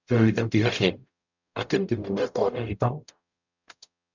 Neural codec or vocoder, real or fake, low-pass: codec, 44.1 kHz, 0.9 kbps, DAC; fake; 7.2 kHz